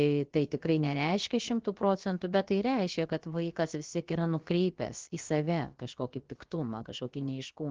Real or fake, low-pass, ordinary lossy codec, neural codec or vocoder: fake; 7.2 kHz; Opus, 16 kbps; codec, 16 kHz, about 1 kbps, DyCAST, with the encoder's durations